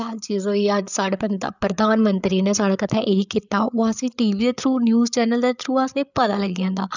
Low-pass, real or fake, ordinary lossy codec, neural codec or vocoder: 7.2 kHz; fake; none; codec, 16 kHz, 8 kbps, FreqCodec, larger model